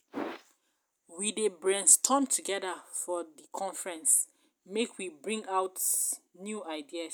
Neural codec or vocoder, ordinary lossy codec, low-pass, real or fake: none; none; none; real